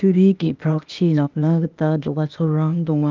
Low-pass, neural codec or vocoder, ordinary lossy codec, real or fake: 7.2 kHz; codec, 16 kHz, 0.8 kbps, ZipCodec; Opus, 24 kbps; fake